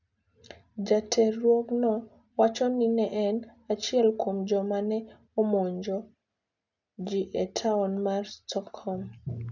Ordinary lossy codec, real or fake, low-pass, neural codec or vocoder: none; real; 7.2 kHz; none